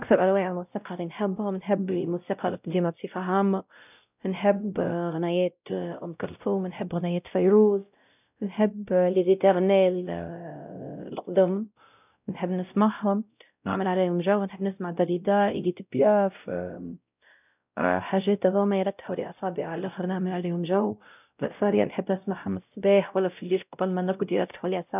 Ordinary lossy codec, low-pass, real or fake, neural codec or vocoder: none; 3.6 kHz; fake; codec, 16 kHz, 0.5 kbps, X-Codec, WavLM features, trained on Multilingual LibriSpeech